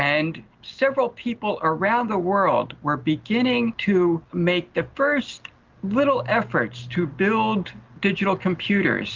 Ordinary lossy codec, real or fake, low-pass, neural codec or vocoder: Opus, 24 kbps; real; 7.2 kHz; none